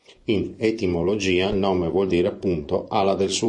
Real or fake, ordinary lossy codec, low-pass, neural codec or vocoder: fake; MP3, 48 kbps; 10.8 kHz; vocoder, 24 kHz, 100 mel bands, Vocos